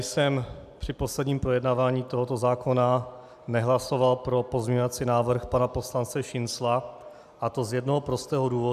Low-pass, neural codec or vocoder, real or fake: 14.4 kHz; none; real